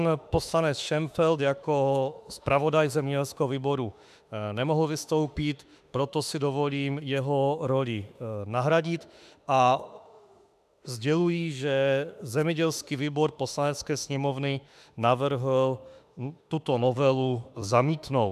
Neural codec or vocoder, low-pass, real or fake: autoencoder, 48 kHz, 32 numbers a frame, DAC-VAE, trained on Japanese speech; 14.4 kHz; fake